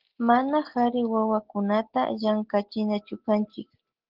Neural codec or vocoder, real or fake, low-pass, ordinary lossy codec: none; real; 5.4 kHz; Opus, 16 kbps